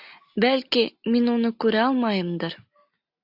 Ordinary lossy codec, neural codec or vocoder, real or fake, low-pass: AAC, 48 kbps; none; real; 5.4 kHz